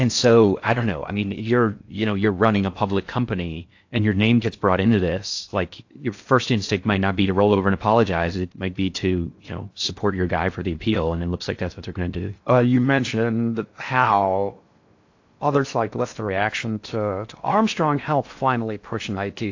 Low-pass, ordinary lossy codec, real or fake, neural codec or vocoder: 7.2 kHz; AAC, 48 kbps; fake; codec, 16 kHz in and 24 kHz out, 0.6 kbps, FocalCodec, streaming, 4096 codes